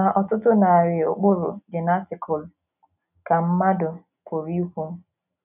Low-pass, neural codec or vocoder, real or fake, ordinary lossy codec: 3.6 kHz; none; real; none